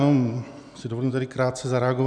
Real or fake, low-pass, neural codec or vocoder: real; 9.9 kHz; none